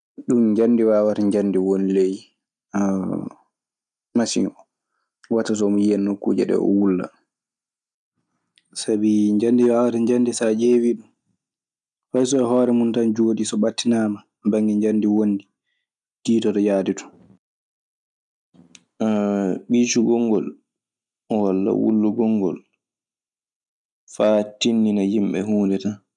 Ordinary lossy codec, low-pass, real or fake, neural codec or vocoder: none; 10.8 kHz; real; none